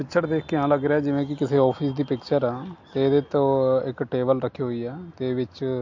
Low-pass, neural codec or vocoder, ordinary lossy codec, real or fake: 7.2 kHz; none; MP3, 48 kbps; real